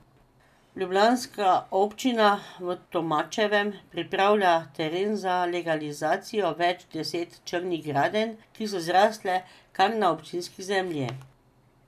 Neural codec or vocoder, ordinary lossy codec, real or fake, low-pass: none; none; real; 14.4 kHz